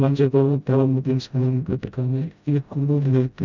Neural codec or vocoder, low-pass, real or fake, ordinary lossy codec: codec, 16 kHz, 0.5 kbps, FreqCodec, smaller model; 7.2 kHz; fake; none